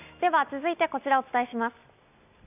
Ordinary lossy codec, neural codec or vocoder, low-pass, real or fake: none; none; 3.6 kHz; real